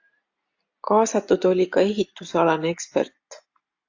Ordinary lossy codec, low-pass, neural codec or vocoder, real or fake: AAC, 48 kbps; 7.2 kHz; none; real